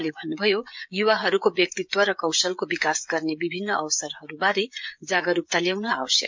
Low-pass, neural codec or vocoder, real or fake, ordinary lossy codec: 7.2 kHz; codec, 16 kHz, 16 kbps, FreqCodec, smaller model; fake; MP3, 64 kbps